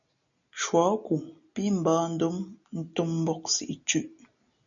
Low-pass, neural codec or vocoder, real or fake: 7.2 kHz; none; real